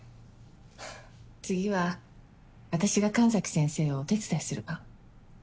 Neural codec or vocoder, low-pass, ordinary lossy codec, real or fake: none; none; none; real